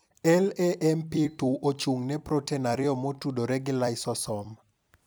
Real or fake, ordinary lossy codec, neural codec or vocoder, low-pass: fake; none; vocoder, 44.1 kHz, 128 mel bands every 256 samples, BigVGAN v2; none